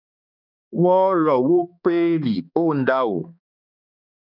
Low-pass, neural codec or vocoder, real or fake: 5.4 kHz; codec, 16 kHz, 2 kbps, X-Codec, HuBERT features, trained on balanced general audio; fake